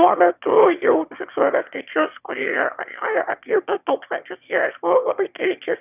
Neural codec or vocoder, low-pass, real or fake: autoencoder, 22.05 kHz, a latent of 192 numbers a frame, VITS, trained on one speaker; 3.6 kHz; fake